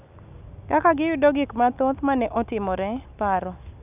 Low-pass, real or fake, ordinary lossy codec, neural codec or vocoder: 3.6 kHz; real; none; none